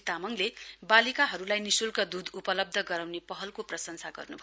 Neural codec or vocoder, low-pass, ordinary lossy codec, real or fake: none; none; none; real